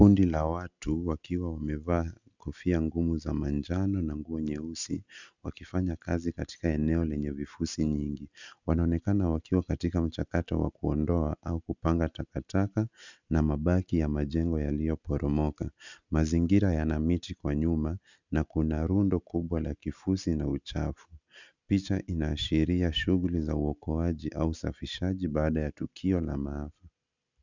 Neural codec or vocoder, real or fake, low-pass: none; real; 7.2 kHz